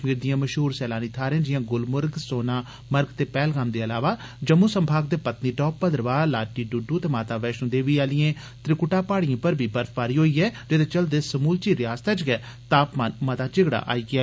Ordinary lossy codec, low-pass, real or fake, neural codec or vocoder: none; none; real; none